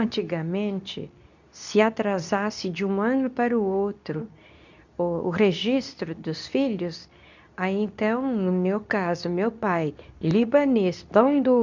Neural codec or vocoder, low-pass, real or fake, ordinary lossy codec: codec, 24 kHz, 0.9 kbps, WavTokenizer, medium speech release version 2; 7.2 kHz; fake; none